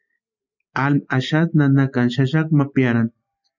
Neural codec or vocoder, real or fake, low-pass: none; real; 7.2 kHz